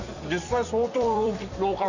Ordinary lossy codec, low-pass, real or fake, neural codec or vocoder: none; 7.2 kHz; fake; vocoder, 44.1 kHz, 128 mel bands every 256 samples, BigVGAN v2